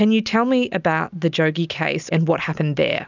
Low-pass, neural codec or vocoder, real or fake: 7.2 kHz; none; real